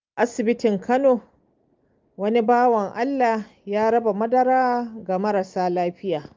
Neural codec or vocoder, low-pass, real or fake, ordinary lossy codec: none; 7.2 kHz; real; Opus, 24 kbps